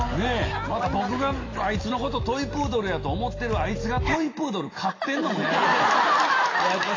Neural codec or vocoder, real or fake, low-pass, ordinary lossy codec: none; real; 7.2 kHz; AAC, 32 kbps